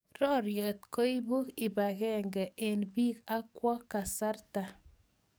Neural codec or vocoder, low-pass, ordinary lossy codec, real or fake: codec, 44.1 kHz, 7.8 kbps, DAC; none; none; fake